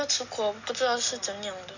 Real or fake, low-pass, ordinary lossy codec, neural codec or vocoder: real; 7.2 kHz; none; none